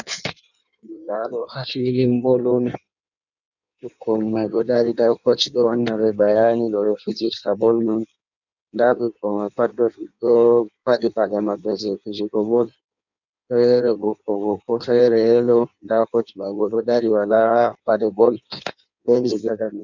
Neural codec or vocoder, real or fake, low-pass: codec, 16 kHz in and 24 kHz out, 1.1 kbps, FireRedTTS-2 codec; fake; 7.2 kHz